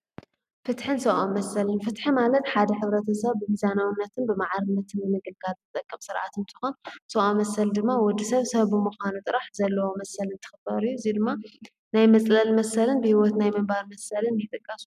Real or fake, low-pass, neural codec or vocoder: real; 14.4 kHz; none